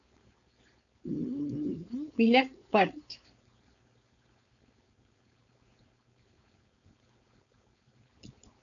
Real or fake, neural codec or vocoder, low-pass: fake; codec, 16 kHz, 4.8 kbps, FACodec; 7.2 kHz